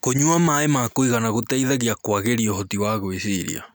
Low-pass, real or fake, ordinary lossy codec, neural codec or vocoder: none; real; none; none